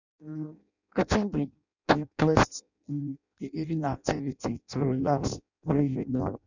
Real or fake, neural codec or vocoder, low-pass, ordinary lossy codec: fake; codec, 16 kHz in and 24 kHz out, 0.6 kbps, FireRedTTS-2 codec; 7.2 kHz; none